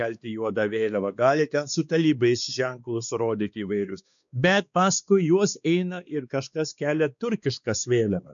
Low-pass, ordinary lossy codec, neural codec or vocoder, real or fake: 7.2 kHz; MP3, 96 kbps; codec, 16 kHz, 2 kbps, X-Codec, WavLM features, trained on Multilingual LibriSpeech; fake